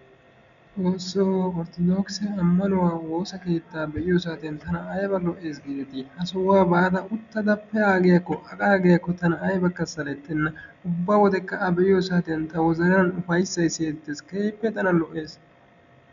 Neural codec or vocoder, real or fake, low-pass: none; real; 7.2 kHz